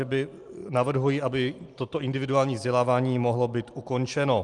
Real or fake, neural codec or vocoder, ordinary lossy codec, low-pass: real; none; Opus, 32 kbps; 10.8 kHz